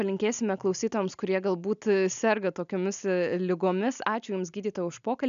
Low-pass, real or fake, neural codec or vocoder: 7.2 kHz; real; none